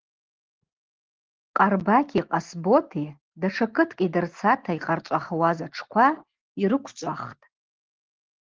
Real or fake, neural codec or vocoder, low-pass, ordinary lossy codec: real; none; 7.2 kHz; Opus, 16 kbps